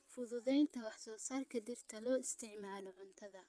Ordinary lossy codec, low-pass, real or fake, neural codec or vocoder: none; none; fake; vocoder, 22.05 kHz, 80 mel bands, WaveNeXt